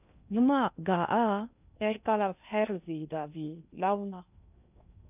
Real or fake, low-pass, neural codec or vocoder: fake; 3.6 kHz; codec, 16 kHz in and 24 kHz out, 0.6 kbps, FocalCodec, streaming, 2048 codes